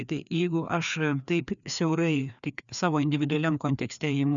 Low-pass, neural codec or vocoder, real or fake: 7.2 kHz; codec, 16 kHz, 2 kbps, FreqCodec, larger model; fake